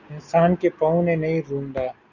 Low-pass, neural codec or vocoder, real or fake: 7.2 kHz; none; real